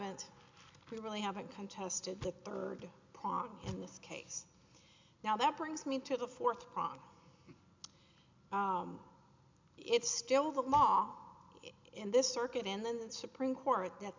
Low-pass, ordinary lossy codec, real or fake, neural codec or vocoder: 7.2 kHz; MP3, 64 kbps; real; none